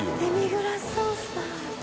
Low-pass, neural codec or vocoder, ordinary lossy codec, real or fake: none; none; none; real